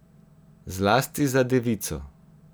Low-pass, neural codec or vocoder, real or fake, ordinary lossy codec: none; none; real; none